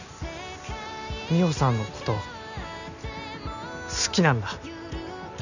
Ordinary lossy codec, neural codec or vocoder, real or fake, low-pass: none; none; real; 7.2 kHz